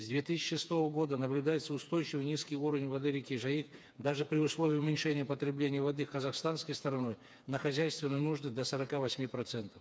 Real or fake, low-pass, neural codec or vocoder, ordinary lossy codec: fake; none; codec, 16 kHz, 4 kbps, FreqCodec, smaller model; none